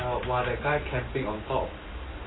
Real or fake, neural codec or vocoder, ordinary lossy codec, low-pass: real; none; AAC, 16 kbps; 7.2 kHz